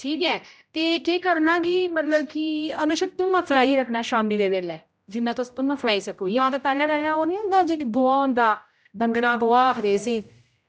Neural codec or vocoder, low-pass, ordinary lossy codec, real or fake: codec, 16 kHz, 0.5 kbps, X-Codec, HuBERT features, trained on general audio; none; none; fake